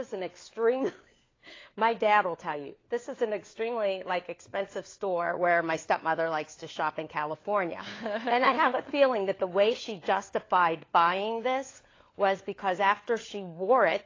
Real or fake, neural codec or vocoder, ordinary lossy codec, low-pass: fake; codec, 16 kHz, 4 kbps, FunCodec, trained on LibriTTS, 50 frames a second; AAC, 32 kbps; 7.2 kHz